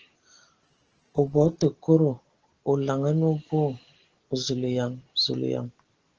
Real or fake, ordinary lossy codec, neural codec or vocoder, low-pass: real; Opus, 16 kbps; none; 7.2 kHz